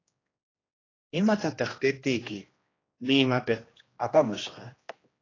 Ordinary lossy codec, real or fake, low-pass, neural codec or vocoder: AAC, 32 kbps; fake; 7.2 kHz; codec, 16 kHz, 1 kbps, X-Codec, HuBERT features, trained on general audio